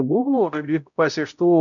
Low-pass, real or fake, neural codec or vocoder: 7.2 kHz; fake; codec, 16 kHz, 0.5 kbps, X-Codec, HuBERT features, trained on balanced general audio